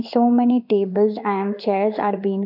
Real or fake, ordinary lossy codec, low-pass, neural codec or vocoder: fake; none; 5.4 kHz; codec, 16 kHz in and 24 kHz out, 1 kbps, XY-Tokenizer